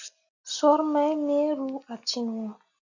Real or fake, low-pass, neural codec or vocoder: real; 7.2 kHz; none